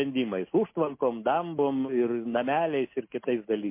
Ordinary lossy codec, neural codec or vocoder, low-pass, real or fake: MP3, 24 kbps; none; 3.6 kHz; real